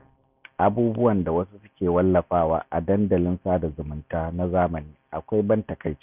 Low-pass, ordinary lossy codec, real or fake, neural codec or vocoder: 3.6 kHz; none; real; none